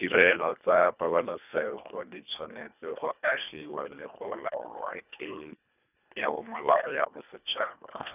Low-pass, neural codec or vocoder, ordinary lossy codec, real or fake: 3.6 kHz; codec, 24 kHz, 1.5 kbps, HILCodec; none; fake